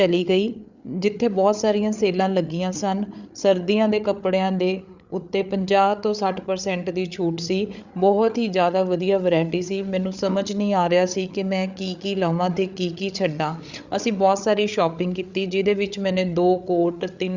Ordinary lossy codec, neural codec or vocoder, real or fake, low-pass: Opus, 64 kbps; codec, 16 kHz, 8 kbps, FreqCodec, larger model; fake; 7.2 kHz